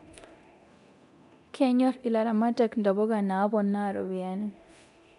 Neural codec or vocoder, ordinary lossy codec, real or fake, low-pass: codec, 24 kHz, 0.9 kbps, DualCodec; none; fake; 10.8 kHz